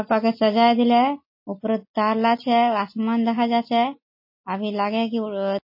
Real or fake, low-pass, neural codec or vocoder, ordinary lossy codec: real; 5.4 kHz; none; MP3, 24 kbps